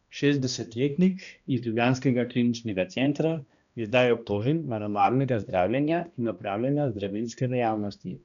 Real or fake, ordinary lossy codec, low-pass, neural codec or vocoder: fake; none; 7.2 kHz; codec, 16 kHz, 1 kbps, X-Codec, HuBERT features, trained on balanced general audio